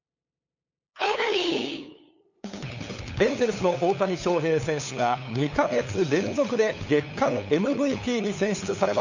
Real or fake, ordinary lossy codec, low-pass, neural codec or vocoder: fake; none; 7.2 kHz; codec, 16 kHz, 8 kbps, FunCodec, trained on LibriTTS, 25 frames a second